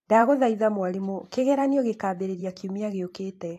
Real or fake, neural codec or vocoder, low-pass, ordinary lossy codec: fake; vocoder, 44.1 kHz, 128 mel bands every 512 samples, BigVGAN v2; 14.4 kHz; AAC, 64 kbps